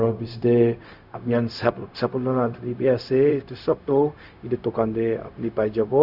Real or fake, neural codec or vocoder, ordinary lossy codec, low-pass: fake; codec, 16 kHz, 0.4 kbps, LongCat-Audio-Codec; none; 5.4 kHz